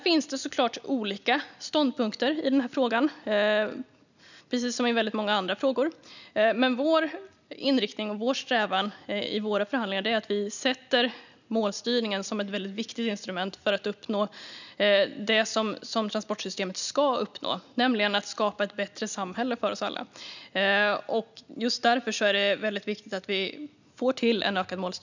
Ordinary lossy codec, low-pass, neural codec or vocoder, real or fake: none; 7.2 kHz; none; real